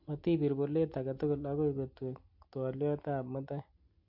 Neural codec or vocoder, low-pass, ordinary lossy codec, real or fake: none; 5.4 kHz; AAC, 48 kbps; real